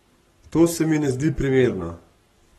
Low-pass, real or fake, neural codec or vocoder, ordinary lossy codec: 19.8 kHz; fake; codec, 44.1 kHz, 7.8 kbps, Pupu-Codec; AAC, 32 kbps